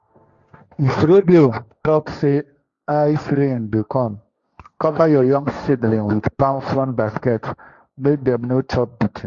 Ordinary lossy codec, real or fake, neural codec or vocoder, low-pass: Opus, 64 kbps; fake; codec, 16 kHz, 1.1 kbps, Voila-Tokenizer; 7.2 kHz